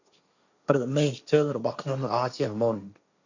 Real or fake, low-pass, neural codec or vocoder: fake; 7.2 kHz; codec, 16 kHz, 1.1 kbps, Voila-Tokenizer